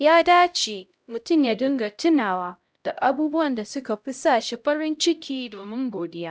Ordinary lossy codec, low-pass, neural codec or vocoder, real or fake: none; none; codec, 16 kHz, 0.5 kbps, X-Codec, HuBERT features, trained on LibriSpeech; fake